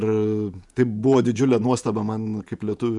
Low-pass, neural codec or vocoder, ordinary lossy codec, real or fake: 10.8 kHz; vocoder, 48 kHz, 128 mel bands, Vocos; MP3, 96 kbps; fake